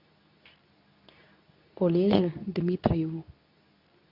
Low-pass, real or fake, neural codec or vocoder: 5.4 kHz; fake; codec, 24 kHz, 0.9 kbps, WavTokenizer, medium speech release version 2